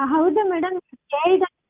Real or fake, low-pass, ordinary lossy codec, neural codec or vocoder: real; 3.6 kHz; Opus, 32 kbps; none